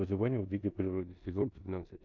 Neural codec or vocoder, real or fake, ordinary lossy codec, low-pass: codec, 16 kHz in and 24 kHz out, 0.9 kbps, LongCat-Audio-Codec, four codebook decoder; fake; MP3, 64 kbps; 7.2 kHz